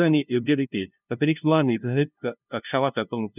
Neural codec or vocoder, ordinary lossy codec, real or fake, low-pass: codec, 16 kHz, 0.5 kbps, FunCodec, trained on LibriTTS, 25 frames a second; none; fake; 3.6 kHz